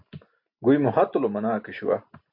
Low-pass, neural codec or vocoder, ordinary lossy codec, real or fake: 5.4 kHz; none; MP3, 48 kbps; real